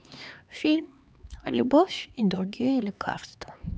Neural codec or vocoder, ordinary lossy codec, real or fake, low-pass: codec, 16 kHz, 4 kbps, X-Codec, HuBERT features, trained on LibriSpeech; none; fake; none